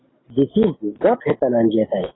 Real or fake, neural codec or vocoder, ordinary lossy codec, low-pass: real; none; AAC, 16 kbps; 7.2 kHz